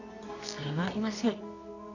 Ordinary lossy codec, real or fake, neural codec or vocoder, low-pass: none; fake; codec, 24 kHz, 0.9 kbps, WavTokenizer, medium music audio release; 7.2 kHz